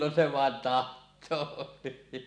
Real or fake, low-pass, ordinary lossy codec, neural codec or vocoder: real; 9.9 kHz; none; none